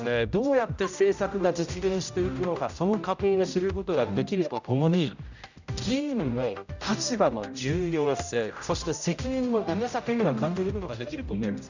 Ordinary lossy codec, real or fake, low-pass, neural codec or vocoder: none; fake; 7.2 kHz; codec, 16 kHz, 0.5 kbps, X-Codec, HuBERT features, trained on general audio